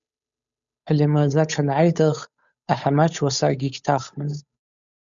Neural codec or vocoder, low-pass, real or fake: codec, 16 kHz, 8 kbps, FunCodec, trained on Chinese and English, 25 frames a second; 7.2 kHz; fake